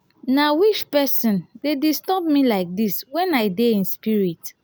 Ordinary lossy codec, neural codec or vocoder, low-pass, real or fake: none; none; none; real